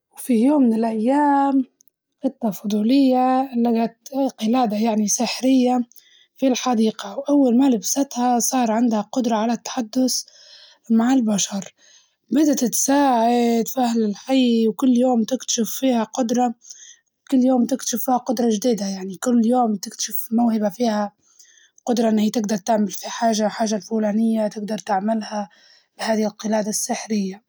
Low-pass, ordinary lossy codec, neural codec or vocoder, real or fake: none; none; none; real